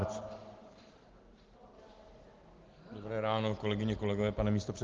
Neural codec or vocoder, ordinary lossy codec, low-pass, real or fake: none; Opus, 16 kbps; 7.2 kHz; real